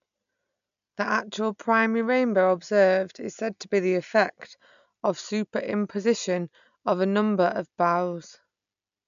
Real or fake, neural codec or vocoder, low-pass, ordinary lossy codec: real; none; 7.2 kHz; none